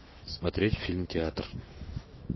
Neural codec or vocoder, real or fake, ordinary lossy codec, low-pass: autoencoder, 48 kHz, 128 numbers a frame, DAC-VAE, trained on Japanese speech; fake; MP3, 24 kbps; 7.2 kHz